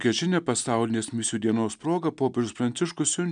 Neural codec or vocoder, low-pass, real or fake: none; 9.9 kHz; real